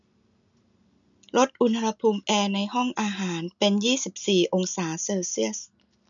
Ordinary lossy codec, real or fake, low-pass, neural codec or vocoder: none; real; 7.2 kHz; none